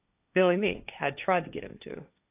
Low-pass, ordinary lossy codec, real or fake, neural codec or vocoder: 3.6 kHz; AAC, 24 kbps; fake; codec, 16 kHz, 1.1 kbps, Voila-Tokenizer